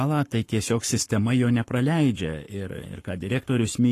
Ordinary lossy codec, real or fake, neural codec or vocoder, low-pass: AAC, 48 kbps; fake; codec, 44.1 kHz, 7.8 kbps, Pupu-Codec; 14.4 kHz